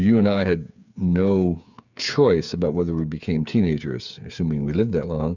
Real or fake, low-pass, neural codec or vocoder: fake; 7.2 kHz; codec, 16 kHz, 8 kbps, FreqCodec, smaller model